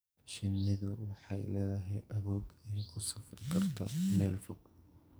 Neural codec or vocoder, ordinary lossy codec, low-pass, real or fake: codec, 44.1 kHz, 2.6 kbps, SNAC; none; none; fake